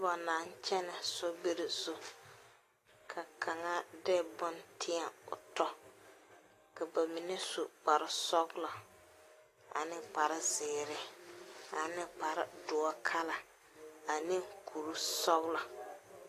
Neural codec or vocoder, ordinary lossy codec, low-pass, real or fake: none; AAC, 48 kbps; 14.4 kHz; real